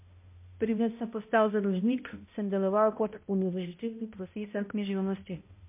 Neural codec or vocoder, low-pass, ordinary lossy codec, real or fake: codec, 16 kHz, 0.5 kbps, X-Codec, HuBERT features, trained on balanced general audio; 3.6 kHz; MP3, 24 kbps; fake